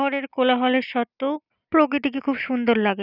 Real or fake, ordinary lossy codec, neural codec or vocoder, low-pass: real; none; none; 5.4 kHz